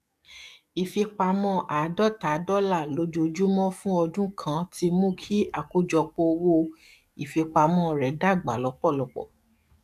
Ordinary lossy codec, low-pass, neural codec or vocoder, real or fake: none; 14.4 kHz; codec, 44.1 kHz, 7.8 kbps, DAC; fake